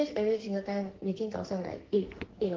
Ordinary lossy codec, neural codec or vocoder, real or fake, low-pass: Opus, 16 kbps; codec, 44.1 kHz, 2.6 kbps, SNAC; fake; 7.2 kHz